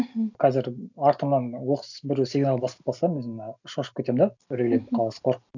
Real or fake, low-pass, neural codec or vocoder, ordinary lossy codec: real; 7.2 kHz; none; none